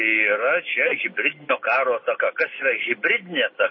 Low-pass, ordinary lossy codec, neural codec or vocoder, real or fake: 7.2 kHz; MP3, 24 kbps; none; real